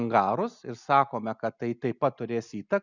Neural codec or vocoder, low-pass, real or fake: none; 7.2 kHz; real